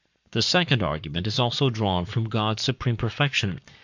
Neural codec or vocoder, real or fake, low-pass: codec, 44.1 kHz, 7.8 kbps, Pupu-Codec; fake; 7.2 kHz